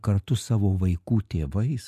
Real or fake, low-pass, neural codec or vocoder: real; 14.4 kHz; none